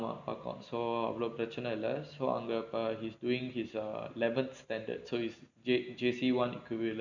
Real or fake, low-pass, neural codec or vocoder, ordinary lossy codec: real; 7.2 kHz; none; none